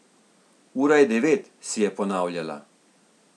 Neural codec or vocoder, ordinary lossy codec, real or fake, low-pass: none; none; real; none